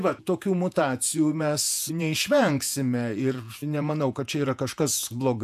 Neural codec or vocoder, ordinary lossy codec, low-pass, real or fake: vocoder, 48 kHz, 128 mel bands, Vocos; AAC, 96 kbps; 14.4 kHz; fake